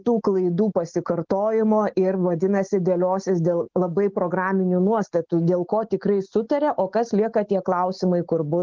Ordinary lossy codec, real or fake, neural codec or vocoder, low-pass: Opus, 16 kbps; real; none; 7.2 kHz